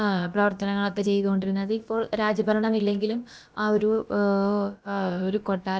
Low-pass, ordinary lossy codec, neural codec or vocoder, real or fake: none; none; codec, 16 kHz, about 1 kbps, DyCAST, with the encoder's durations; fake